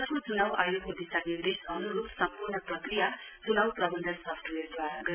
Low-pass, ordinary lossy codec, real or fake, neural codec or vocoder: 3.6 kHz; none; real; none